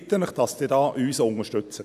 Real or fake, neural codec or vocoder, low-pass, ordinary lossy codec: real; none; 14.4 kHz; none